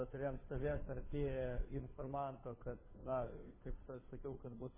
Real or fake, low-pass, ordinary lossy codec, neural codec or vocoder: fake; 3.6 kHz; MP3, 16 kbps; codec, 16 kHz, 2 kbps, FunCodec, trained on Chinese and English, 25 frames a second